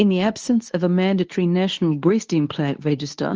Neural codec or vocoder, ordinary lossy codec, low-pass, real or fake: codec, 24 kHz, 0.9 kbps, WavTokenizer, medium speech release version 2; Opus, 24 kbps; 7.2 kHz; fake